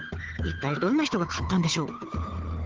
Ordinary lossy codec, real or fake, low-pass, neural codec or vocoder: Opus, 16 kbps; fake; 7.2 kHz; codec, 16 kHz, 4 kbps, FunCodec, trained on Chinese and English, 50 frames a second